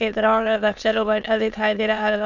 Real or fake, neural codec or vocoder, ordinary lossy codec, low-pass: fake; autoencoder, 22.05 kHz, a latent of 192 numbers a frame, VITS, trained on many speakers; none; 7.2 kHz